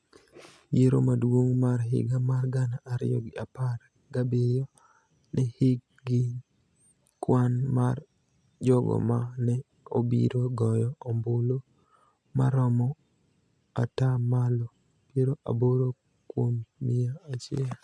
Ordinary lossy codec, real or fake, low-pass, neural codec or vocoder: none; real; none; none